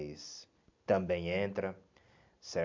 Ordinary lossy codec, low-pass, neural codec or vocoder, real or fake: MP3, 64 kbps; 7.2 kHz; none; real